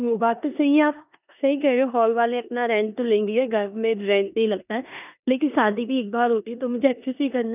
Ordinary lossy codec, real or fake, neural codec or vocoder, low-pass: none; fake; codec, 16 kHz in and 24 kHz out, 0.9 kbps, LongCat-Audio-Codec, four codebook decoder; 3.6 kHz